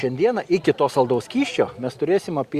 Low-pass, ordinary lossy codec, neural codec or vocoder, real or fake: 14.4 kHz; Opus, 64 kbps; none; real